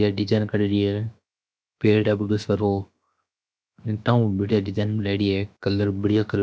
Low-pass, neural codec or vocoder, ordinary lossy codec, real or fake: none; codec, 16 kHz, 0.7 kbps, FocalCodec; none; fake